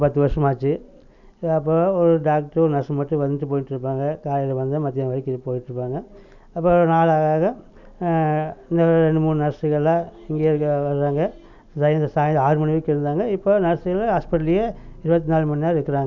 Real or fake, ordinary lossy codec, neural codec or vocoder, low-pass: real; none; none; 7.2 kHz